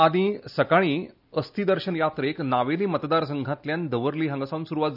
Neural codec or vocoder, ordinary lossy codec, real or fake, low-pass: none; none; real; 5.4 kHz